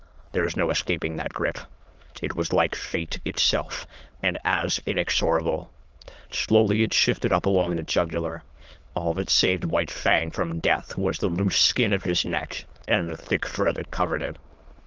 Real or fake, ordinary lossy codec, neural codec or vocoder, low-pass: fake; Opus, 24 kbps; autoencoder, 22.05 kHz, a latent of 192 numbers a frame, VITS, trained on many speakers; 7.2 kHz